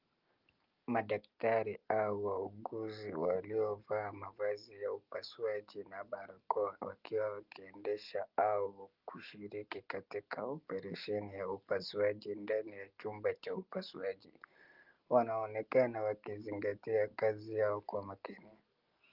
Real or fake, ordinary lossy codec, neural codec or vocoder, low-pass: real; Opus, 32 kbps; none; 5.4 kHz